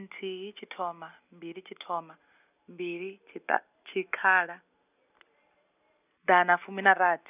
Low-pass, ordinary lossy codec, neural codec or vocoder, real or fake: 3.6 kHz; none; none; real